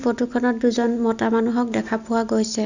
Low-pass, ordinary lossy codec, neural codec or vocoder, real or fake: 7.2 kHz; none; none; real